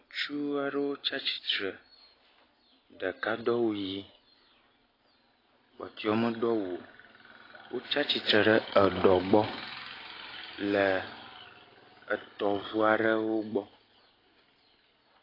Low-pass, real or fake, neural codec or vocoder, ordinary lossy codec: 5.4 kHz; real; none; AAC, 24 kbps